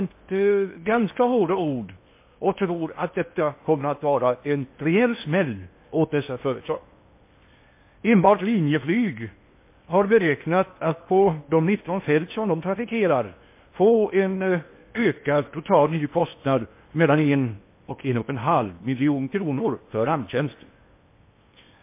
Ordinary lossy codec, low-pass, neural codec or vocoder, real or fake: MP3, 24 kbps; 3.6 kHz; codec, 16 kHz in and 24 kHz out, 0.8 kbps, FocalCodec, streaming, 65536 codes; fake